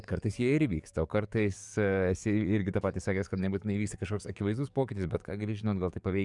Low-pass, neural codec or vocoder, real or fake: 10.8 kHz; codec, 44.1 kHz, 7.8 kbps, DAC; fake